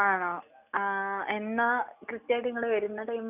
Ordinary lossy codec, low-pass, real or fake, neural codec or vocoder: none; 3.6 kHz; real; none